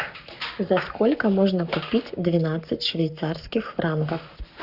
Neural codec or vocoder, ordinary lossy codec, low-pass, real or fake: codec, 44.1 kHz, 7.8 kbps, Pupu-Codec; Opus, 64 kbps; 5.4 kHz; fake